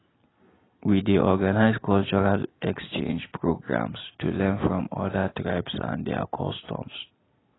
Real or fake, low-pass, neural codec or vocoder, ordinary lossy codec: real; 7.2 kHz; none; AAC, 16 kbps